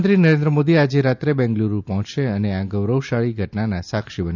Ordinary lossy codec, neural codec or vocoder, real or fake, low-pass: none; none; real; 7.2 kHz